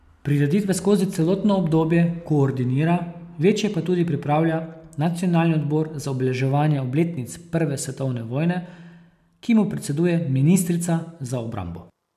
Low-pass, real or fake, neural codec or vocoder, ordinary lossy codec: 14.4 kHz; real; none; none